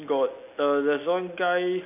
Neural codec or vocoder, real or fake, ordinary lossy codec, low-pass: none; real; none; 3.6 kHz